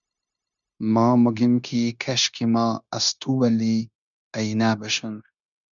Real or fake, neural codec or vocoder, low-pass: fake; codec, 16 kHz, 0.9 kbps, LongCat-Audio-Codec; 7.2 kHz